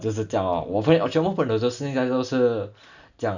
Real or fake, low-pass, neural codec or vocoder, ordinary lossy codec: real; 7.2 kHz; none; none